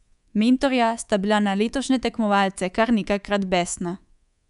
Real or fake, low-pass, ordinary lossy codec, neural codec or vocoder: fake; 10.8 kHz; none; codec, 24 kHz, 3.1 kbps, DualCodec